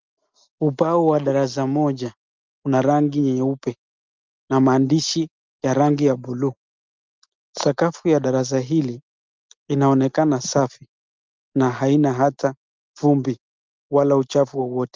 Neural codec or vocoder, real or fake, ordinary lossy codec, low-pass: none; real; Opus, 24 kbps; 7.2 kHz